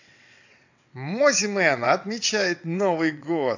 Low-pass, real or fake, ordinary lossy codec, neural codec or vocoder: 7.2 kHz; fake; AAC, 48 kbps; vocoder, 22.05 kHz, 80 mel bands, WaveNeXt